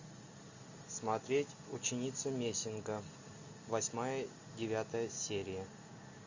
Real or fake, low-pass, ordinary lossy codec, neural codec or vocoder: real; 7.2 kHz; Opus, 64 kbps; none